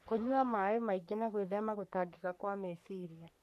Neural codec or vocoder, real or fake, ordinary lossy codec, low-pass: codec, 44.1 kHz, 3.4 kbps, Pupu-Codec; fake; none; 14.4 kHz